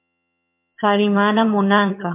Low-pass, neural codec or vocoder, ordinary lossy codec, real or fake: 3.6 kHz; vocoder, 22.05 kHz, 80 mel bands, HiFi-GAN; MP3, 32 kbps; fake